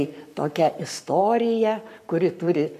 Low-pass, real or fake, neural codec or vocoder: 14.4 kHz; fake; codec, 44.1 kHz, 7.8 kbps, Pupu-Codec